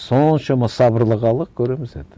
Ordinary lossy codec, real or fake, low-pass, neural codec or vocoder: none; real; none; none